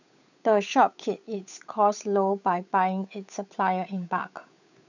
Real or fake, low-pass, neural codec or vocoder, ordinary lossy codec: fake; 7.2 kHz; codec, 16 kHz, 8 kbps, FunCodec, trained on Chinese and English, 25 frames a second; none